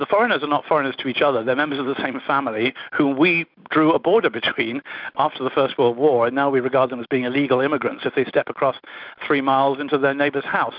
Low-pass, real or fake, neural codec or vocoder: 5.4 kHz; real; none